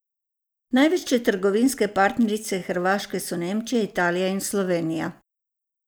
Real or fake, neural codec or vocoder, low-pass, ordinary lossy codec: real; none; none; none